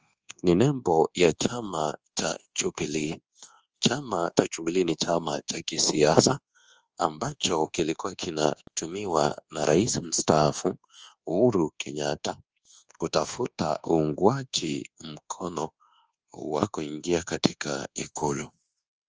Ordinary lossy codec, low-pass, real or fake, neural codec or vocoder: Opus, 24 kbps; 7.2 kHz; fake; codec, 24 kHz, 1.2 kbps, DualCodec